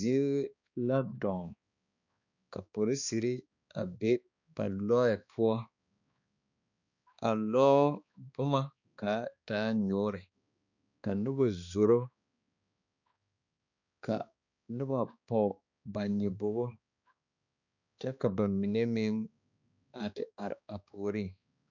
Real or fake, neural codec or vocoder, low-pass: fake; codec, 16 kHz, 2 kbps, X-Codec, HuBERT features, trained on balanced general audio; 7.2 kHz